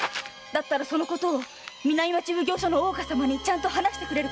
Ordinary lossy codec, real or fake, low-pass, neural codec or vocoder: none; real; none; none